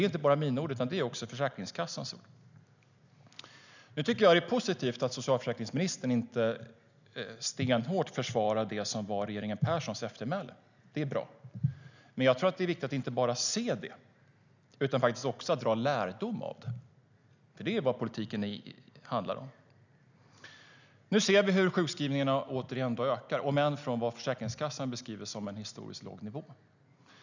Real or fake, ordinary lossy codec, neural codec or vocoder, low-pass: real; none; none; 7.2 kHz